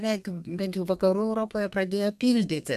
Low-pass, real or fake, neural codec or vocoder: 14.4 kHz; fake; codec, 32 kHz, 1.9 kbps, SNAC